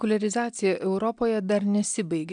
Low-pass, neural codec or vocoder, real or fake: 9.9 kHz; none; real